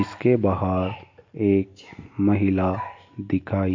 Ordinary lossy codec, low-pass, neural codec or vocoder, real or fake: MP3, 48 kbps; 7.2 kHz; none; real